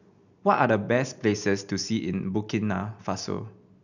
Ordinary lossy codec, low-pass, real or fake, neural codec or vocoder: none; 7.2 kHz; real; none